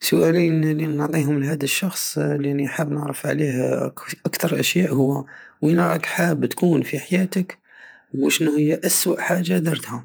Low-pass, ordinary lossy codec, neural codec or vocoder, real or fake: none; none; vocoder, 48 kHz, 128 mel bands, Vocos; fake